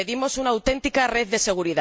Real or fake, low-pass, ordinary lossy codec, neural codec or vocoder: real; none; none; none